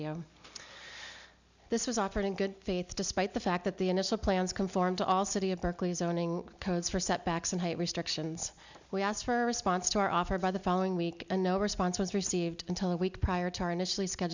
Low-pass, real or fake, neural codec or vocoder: 7.2 kHz; real; none